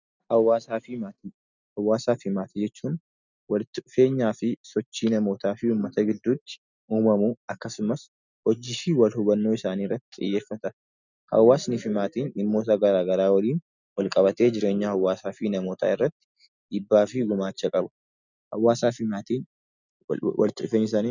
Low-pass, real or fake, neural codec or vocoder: 7.2 kHz; real; none